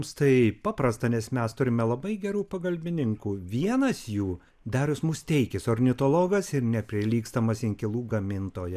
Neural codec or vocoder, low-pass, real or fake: none; 14.4 kHz; real